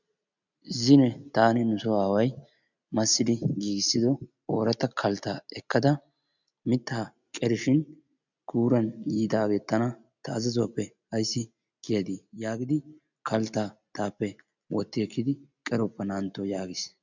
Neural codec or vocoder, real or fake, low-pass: none; real; 7.2 kHz